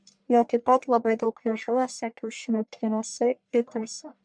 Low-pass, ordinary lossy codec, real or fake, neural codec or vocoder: 9.9 kHz; MP3, 64 kbps; fake; codec, 44.1 kHz, 1.7 kbps, Pupu-Codec